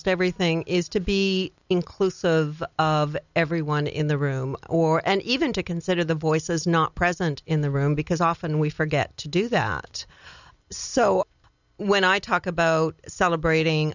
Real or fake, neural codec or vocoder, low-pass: real; none; 7.2 kHz